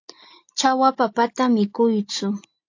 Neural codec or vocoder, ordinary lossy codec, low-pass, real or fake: none; AAC, 48 kbps; 7.2 kHz; real